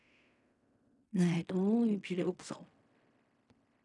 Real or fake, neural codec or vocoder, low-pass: fake; codec, 16 kHz in and 24 kHz out, 0.4 kbps, LongCat-Audio-Codec, fine tuned four codebook decoder; 10.8 kHz